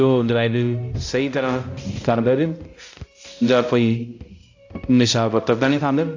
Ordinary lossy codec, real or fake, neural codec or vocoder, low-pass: AAC, 48 kbps; fake; codec, 16 kHz, 0.5 kbps, X-Codec, HuBERT features, trained on balanced general audio; 7.2 kHz